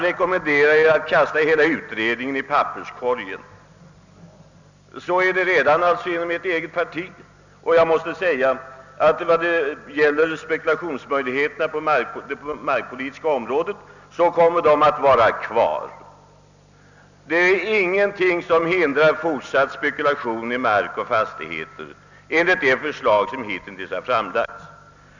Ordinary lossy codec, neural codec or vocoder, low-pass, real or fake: none; none; 7.2 kHz; real